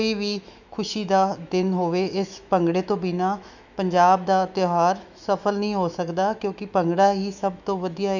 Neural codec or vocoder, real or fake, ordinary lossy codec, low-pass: autoencoder, 48 kHz, 128 numbers a frame, DAC-VAE, trained on Japanese speech; fake; none; 7.2 kHz